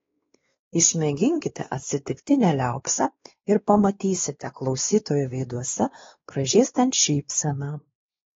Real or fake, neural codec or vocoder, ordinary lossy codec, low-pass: fake; codec, 16 kHz, 2 kbps, X-Codec, WavLM features, trained on Multilingual LibriSpeech; AAC, 24 kbps; 7.2 kHz